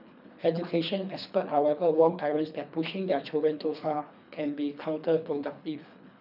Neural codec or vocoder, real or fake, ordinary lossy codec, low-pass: codec, 24 kHz, 3 kbps, HILCodec; fake; none; 5.4 kHz